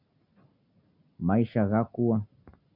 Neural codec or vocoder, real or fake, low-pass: none; real; 5.4 kHz